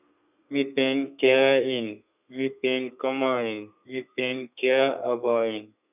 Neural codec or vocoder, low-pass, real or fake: codec, 32 kHz, 1.9 kbps, SNAC; 3.6 kHz; fake